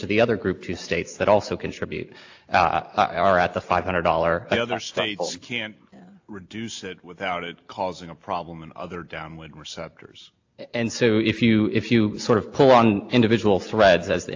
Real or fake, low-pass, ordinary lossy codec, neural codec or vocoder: real; 7.2 kHz; AAC, 48 kbps; none